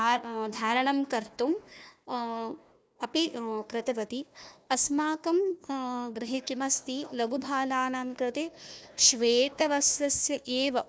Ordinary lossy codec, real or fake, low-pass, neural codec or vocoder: none; fake; none; codec, 16 kHz, 1 kbps, FunCodec, trained on Chinese and English, 50 frames a second